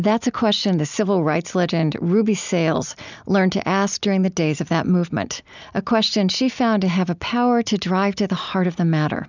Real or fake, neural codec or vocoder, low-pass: real; none; 7.2 kHz